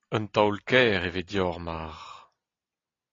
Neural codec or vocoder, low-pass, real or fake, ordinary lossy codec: none; 7.2 kHz; real; AAC, 32 kbps